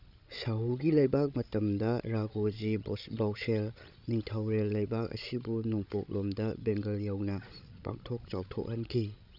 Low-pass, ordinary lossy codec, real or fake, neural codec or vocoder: 5.4 kHz; none; fake; codec, 16 kHz, 16 kbps, FreqCodec, larger model